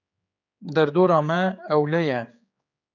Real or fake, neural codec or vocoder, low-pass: fake; codec, 16 kHz, 4 kbps, X-Codec, HuBERT features, trained on general audio; 7.2 kHz